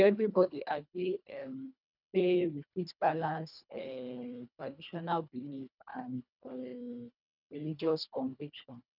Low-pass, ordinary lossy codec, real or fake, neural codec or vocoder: 5.4 kHz; none; fake; codec, 24 kHz, 1.5 kbps, HILCodec